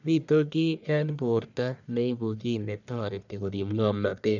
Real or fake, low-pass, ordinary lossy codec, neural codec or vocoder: fake; 7.2 kHz; none; codec, 44.1 kHz, 1.7 kbps, Pupu-Codec